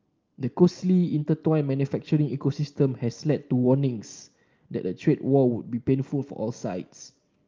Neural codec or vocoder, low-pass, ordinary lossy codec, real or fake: none; 7.2 kHz; Opus, 24 kbps; real